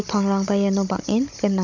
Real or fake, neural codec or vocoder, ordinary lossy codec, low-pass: fake; codec, 16 kHz, 16 kbps, FunCodec, trained on Chinese and English, 50 frames a second; none; 7.2 kHz